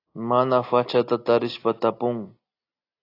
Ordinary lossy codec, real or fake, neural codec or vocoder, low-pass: AAC, 32 kbps; real; none; 5.4 kHz